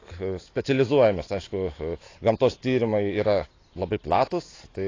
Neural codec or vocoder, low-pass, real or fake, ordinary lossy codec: none; 7.2 kHz; real; AAC, 32 kbps